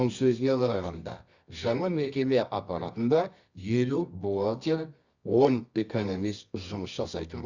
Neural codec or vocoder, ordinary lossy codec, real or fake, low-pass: codec, 24 kHz, 0.9 kbps, WavTokenizer, medium music audio release; Opus, 64 kbps; fake; 7.2 kHz